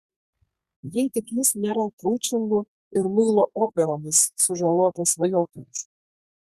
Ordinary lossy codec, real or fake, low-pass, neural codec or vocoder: Opus, 64 kbps; fake; 14.4 kHz; codec, 32 kHz, 1.9 kbps, SNAC